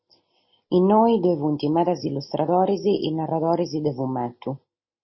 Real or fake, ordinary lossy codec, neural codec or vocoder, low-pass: real; MP3, 24 kbps; none; 7.2 kHz